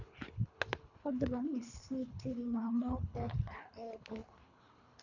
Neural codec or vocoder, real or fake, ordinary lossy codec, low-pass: codec, 24 kHz, 3 kbps, HILCodec; fake; none; 7.2 kHz